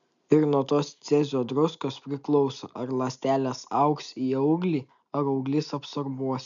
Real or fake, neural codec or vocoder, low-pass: real; none; 7.2 kHz